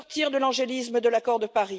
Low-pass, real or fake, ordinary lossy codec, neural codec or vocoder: none; real; none; none